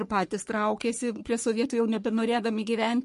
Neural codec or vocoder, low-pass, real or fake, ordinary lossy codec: codec, 44.1 kHz, 3.4 kbps, Pupu-Codec; 14.4 kHz; fake; MP3, 48 kbps